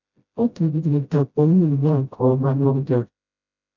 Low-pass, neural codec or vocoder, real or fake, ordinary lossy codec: 7.2 kHz; codec, 16 kHz, 0.5 kbps, FreqCodec, smaller model; fake; none